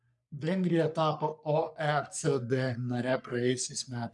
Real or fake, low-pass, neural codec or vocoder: fake; 10.8 kHz; codec, 44.1 kHz, 3.4 kbps, Pupu-Codec